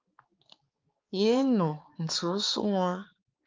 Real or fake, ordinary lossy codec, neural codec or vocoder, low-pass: fake; Opus, 24 kbps; codec, 16 kHz, 4 kbps, X-Codec, HuBERT features, trained on balanced general audio; 7.2 kHz